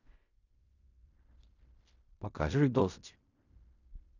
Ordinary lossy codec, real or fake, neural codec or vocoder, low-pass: none; fake; codec, 16 kHz in and 24 kHz out, 0.4 kbps, LongCat-Audio-Codec, fine tuned four codebook decoder; 7.2 kHz